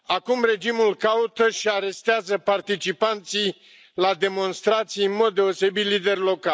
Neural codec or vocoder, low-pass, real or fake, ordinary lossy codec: none; none; real; none